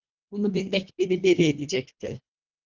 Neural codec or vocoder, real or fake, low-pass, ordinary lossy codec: codec, 24 kHz, 1.5 kbps, HILCodec; fake; 7.2 kHz; Opus, 32 kbps